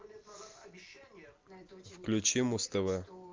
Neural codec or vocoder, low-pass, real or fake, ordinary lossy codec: none; 7.2 kHz; real; Opus, 32 kbps